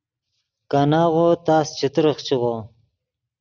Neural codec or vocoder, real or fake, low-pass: none; real; 7.2 kHz